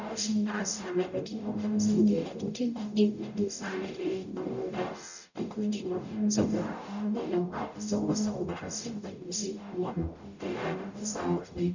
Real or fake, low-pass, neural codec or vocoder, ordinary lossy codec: fake; 7.2 kHz; codec, 44.1 kHz, 0.9 kbps, DAC; none